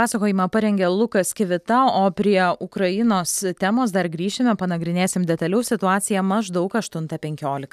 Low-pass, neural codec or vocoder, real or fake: 14.4 kHz; none; real